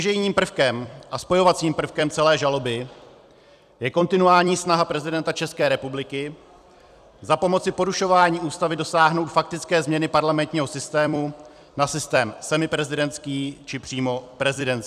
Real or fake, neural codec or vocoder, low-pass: fake; vocoder, 44.1 kHz, 128 mel bands every 256 samples, BigVGAN v2; 14.4 kHz